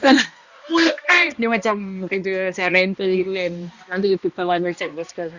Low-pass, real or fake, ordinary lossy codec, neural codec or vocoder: 7.2 kHz; fake; Opus, 64 kbps; codec, 16 kHz, 1 kbps, X-Codec, HuBERT features, trained on balanced general audio